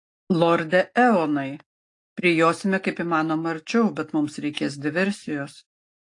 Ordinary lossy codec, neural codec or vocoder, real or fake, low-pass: AAC, 48 kbps; none; real; 10.8 kHz